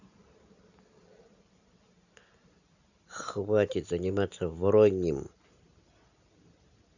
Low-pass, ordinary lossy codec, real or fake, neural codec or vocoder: 7.2 kHz; none; real; none